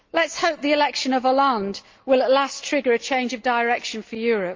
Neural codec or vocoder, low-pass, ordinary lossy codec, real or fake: none; 7.2 kHz; Opus, 32 kbps; real